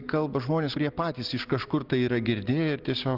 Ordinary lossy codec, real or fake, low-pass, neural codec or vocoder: Opus, 32 kbps; real; 5.4 kHz; none